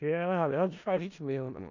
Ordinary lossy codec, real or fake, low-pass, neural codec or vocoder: none; fake; 7.2 kHz; codec, 16 kHz in and 24 kHz out, 0.4 kbps, LongCat-Audio-Codec, four codebook decoder